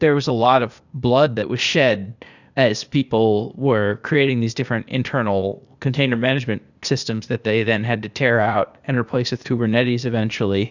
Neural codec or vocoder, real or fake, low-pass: codec, 16 kHz, 0.8 kbps, ZipCodec; fake; 7.2 kHz